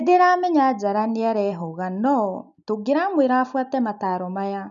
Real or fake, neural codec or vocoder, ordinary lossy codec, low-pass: real; none; none; 7.2 kHz